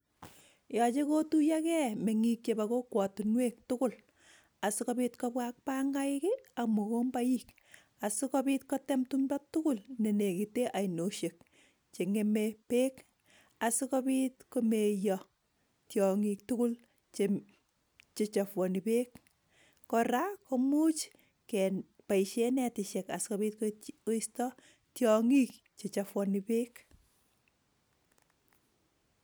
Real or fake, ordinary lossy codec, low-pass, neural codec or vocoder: real; none; none; none